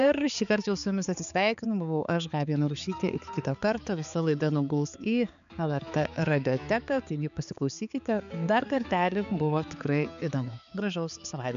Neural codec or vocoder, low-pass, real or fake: codec, 16 kHz, 4 kbps, X-Codec, HuBERT features, trained on balanced general audio; 7.2 kHz; fake